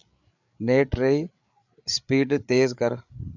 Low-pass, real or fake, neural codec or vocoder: 7.2 kHz; fake; codec, 16 kHz, 8 kbps, FreqCodec, larger model